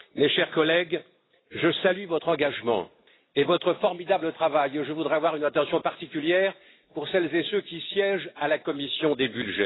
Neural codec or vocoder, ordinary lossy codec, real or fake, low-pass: none; AAC, 16 kbps; real; 7.2 kHz